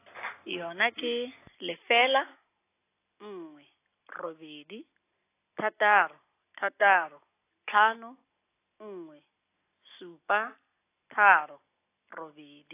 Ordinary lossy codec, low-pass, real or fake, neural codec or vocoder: AAC, 24 kbps; 3.6 kHz; real; none